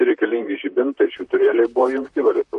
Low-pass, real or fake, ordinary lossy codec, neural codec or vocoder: 14.4 kHz; fake; MP3, 48 kbps; vocoder, 44.1 kHz, 128 mel bands, Pupu-Vocoder